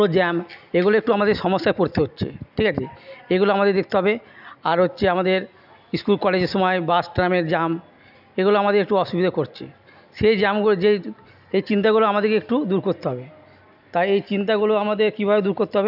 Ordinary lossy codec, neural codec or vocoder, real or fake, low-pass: none; none; real; 5.4 kHz